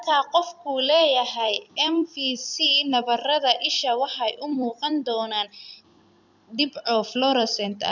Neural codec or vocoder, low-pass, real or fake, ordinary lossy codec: vocoder, 44.1 kHz, 128 mel bands every 256 samples, BigVGAN v2; 7.2 kHz; fake; none